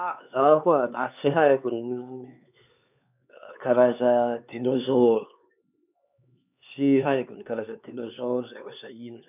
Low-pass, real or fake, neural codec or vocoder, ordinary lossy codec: 3.6 kHz; fake; codec, 16 kHz, 4 kbps, X-Codec, HuBERT features, trained on LibriSpeech; none